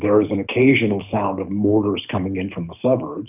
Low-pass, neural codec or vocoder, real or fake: 3.6 kHz; codec, 24 kHz, 6 kbps, HILCodec; fake